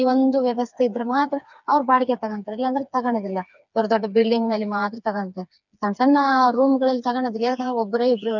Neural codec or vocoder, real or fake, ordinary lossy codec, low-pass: codec, 16 kHz, 4 kbps, FreqCodec, smaller model; fake; none; 7.2 kHz